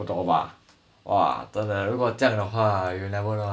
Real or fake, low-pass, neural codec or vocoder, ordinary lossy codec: real; none; none; none